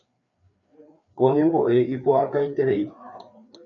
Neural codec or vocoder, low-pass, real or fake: codec, 16 kHz, 4 kbps, FreqCodec, larger model; 7.2 kHz; fake